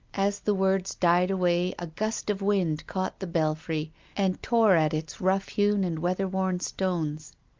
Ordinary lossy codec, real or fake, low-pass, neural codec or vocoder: Opus, 24 kbps; real; 7.2 kHz; none